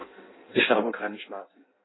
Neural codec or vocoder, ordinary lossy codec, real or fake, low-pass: codec, 16 kHz in and 24 kHz out, 0.6 kbps, FireRedTTS-2 codec; AAC, 16 kbps; fake; 7.2 kHz